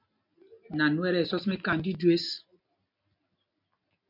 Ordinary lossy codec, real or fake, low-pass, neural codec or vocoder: MP3, 48 kbps; real; 5.4 kHz; none